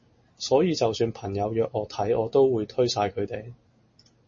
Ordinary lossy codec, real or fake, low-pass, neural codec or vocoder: MP3, 32 kbps; real; 7.2 kHz; none